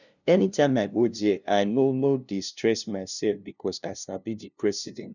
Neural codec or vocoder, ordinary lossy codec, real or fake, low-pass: codec, 16 kHz, 0.5 kbps, FunCodec, trained on LibriTTS, 25 frames a second; none; fake; 7.2 kHz